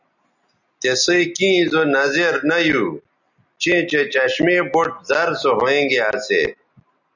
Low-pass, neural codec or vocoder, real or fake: 7.2 kHz; none; real